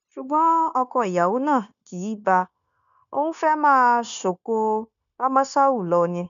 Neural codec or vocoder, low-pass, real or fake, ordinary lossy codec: codec, 16 kHz, 0.9 kbps, LongCat-Audio-Codec; 7.2 kHz; fake; none